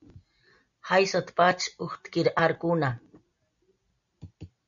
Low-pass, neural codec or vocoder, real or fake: 7.2 kHz; none; real